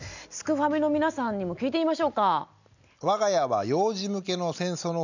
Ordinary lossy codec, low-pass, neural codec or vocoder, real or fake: none; 7.2 kHz; none; real